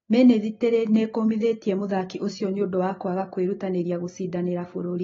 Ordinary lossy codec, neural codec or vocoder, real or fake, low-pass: AAC, 24 kbps; none; real; 7.2 kHz